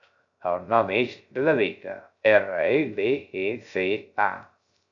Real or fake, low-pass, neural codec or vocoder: fake; 7.2 kHz; codec, 16 kHz, 0.3 kbps, FocalCodec